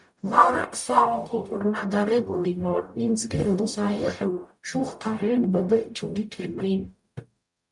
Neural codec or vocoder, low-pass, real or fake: codec, 44.1 kHz, 0.9 kbps, DAC; 10.8 kHz; fake